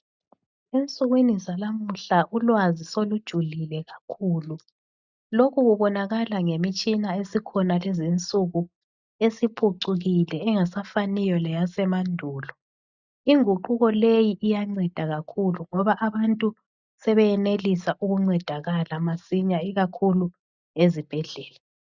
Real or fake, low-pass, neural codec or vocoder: real; 7.2 kHz; none